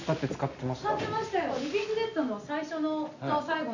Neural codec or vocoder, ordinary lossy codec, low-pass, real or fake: none; none; 7.2 kHz; real